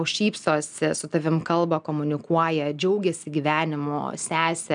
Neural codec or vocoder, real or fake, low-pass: none; real; 9.9 kHz